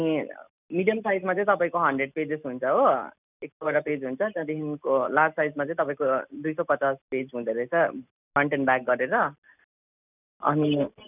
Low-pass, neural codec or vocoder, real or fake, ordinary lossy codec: 3.6 kHz; none; real; none